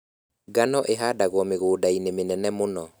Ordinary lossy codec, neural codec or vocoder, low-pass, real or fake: none; none; none; real